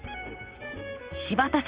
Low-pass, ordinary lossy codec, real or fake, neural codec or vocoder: 3.6 kHz; Opus, 32 kbps; fake; vocoder, 44.1 kHz, 128 mel bands, Pupu-Vocoder